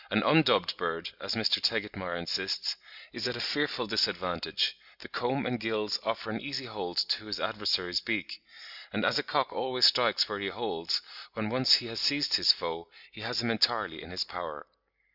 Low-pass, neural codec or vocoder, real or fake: 5.4 kHz; none; real